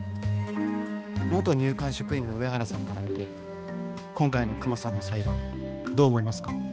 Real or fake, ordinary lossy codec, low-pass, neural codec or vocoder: fake; none; none; codec, 16 kHz, 2 kbps, X-Codec, HuBERT features, trained on balanced general audio